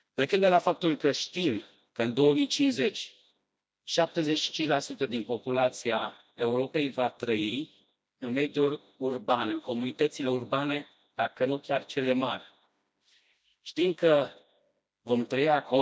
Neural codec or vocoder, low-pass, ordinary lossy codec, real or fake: codec, 16 kHz, 1 kbps, FreqCodec, smaller model; none; none; fake